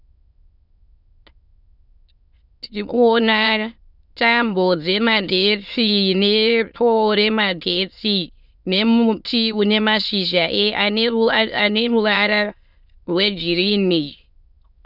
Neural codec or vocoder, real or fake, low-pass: autoencoder, 22.05 kHz, a latent of 192 numbers a frame, VITS, trained on many speakers; fake; 5.4 kHz